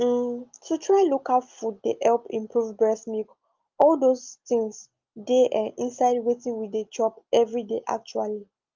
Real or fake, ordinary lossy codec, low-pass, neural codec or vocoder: real; Opus, 32 kbps; 7.2 kHz; none